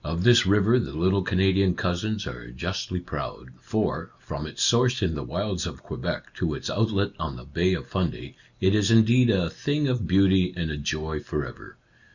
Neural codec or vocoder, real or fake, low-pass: none; real; 7.2 kHz